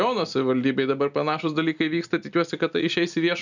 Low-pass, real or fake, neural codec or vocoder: 7.2 kHz; real; none